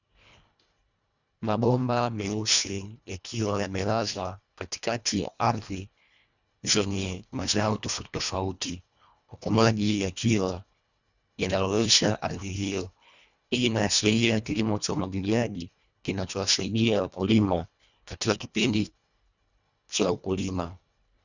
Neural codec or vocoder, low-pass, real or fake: codec, 24 kHz, 1.5 kbps, HILCodec; 7.2 kHz; fake